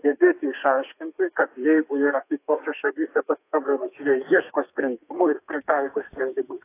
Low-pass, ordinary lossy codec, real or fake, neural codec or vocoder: 3.6 kHz; AAC, 24 kbps; fake; codec, 32 kHz, 1.9 kbps, SNAC